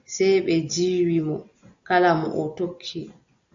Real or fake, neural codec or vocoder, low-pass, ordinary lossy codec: real; none; 7.2 kHz; AAC, 64 kbps